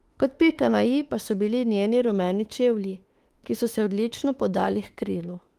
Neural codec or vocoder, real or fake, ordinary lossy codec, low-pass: autoencoder, 48 kHz, 32 numbers a frame, DAC-VAE, trained on Japanese speech; fake; Opus, 24 kbps; 14.4 kHz